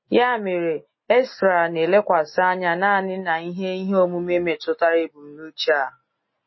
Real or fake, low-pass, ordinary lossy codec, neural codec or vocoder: real; 7.2 kHz; MP3, 24 kbps; none